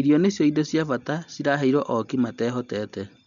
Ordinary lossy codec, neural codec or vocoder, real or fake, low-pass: MP3, 96 kbps; none; real; 7.2 kHz